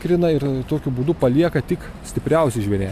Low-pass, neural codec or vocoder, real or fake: 14.4 kHz; none; real